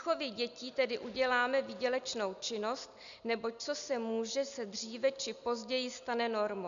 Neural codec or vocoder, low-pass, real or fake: none; 7.2 kHz; real